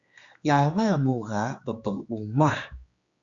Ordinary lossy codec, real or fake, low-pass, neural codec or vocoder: Opus, 64 kbps; fake; 7.2 kHz; codec, 16 kHz, 2 kbps, X-Codec, HuBERT features, trained on general audio